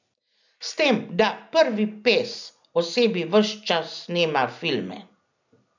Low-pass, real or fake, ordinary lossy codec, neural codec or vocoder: 7.2 kHz; real; none; none